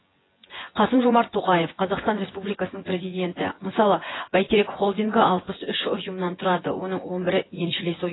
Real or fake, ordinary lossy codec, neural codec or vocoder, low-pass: fake; AAC, 16 kbps; vocoder, 24 kHz, 100 mel bands, Vocos; 7.2 kHz